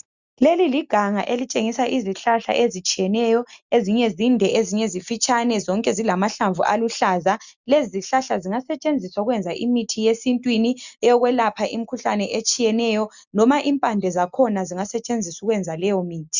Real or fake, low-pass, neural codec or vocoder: real; 7.2 kHz; none